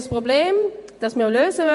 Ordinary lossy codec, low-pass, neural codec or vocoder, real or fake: MP3, 48 kbps; 14.4 kHz; vocoder, 48 kHz, 128 mel bands, Vocos; fake